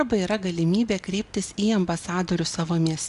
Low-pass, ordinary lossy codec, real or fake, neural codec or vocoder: 10.8 kHz; AAC, 96 kbps; real; none